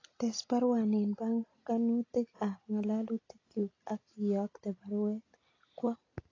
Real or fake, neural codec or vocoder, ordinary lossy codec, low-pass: real; none; AAC, 32 kbps; 7.2 kHz